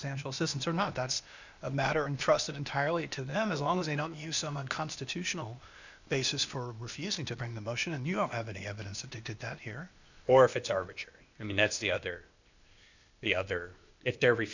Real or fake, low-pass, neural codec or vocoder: fake; 7.2 kHz; codec, 16 kHz, 0.8 kbps, ZipCodec